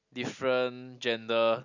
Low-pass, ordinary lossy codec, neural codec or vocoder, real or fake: 7.2 kHz; none; none; real